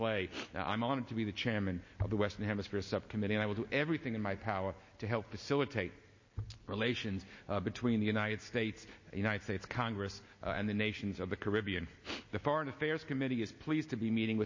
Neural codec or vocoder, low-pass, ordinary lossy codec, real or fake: none; 7.2 kHz; MP3, 32 kbps; real